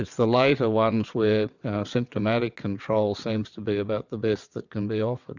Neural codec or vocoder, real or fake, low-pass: vocoder, 22.05 kHz, 80 mel bands, WaveNeXt; fake; 7.2 kHz